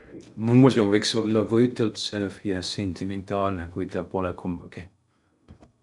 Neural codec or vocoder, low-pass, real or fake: codec, 16 kHz in and 24 kHz out, 0.6 kbps, FocalCodec, streaming, 2048 codes; 10.8 kHz; fake